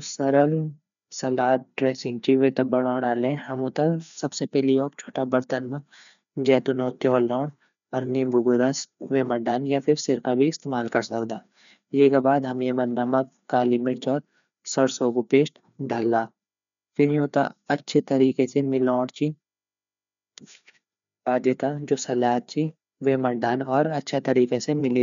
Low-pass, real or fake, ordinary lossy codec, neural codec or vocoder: 7.2 kHz; fake; none; codec, 16 kHz, 2 kbps, FreqCodec, larger model